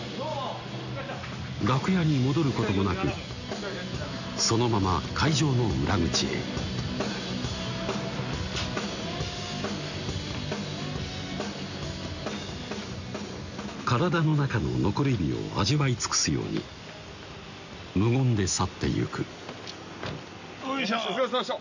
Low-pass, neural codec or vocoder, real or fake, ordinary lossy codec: 7.2 kHz; none; real; none